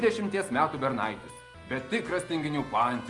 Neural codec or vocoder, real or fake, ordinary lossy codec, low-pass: none; real; Opus, 32 kbps; 10.8 kHz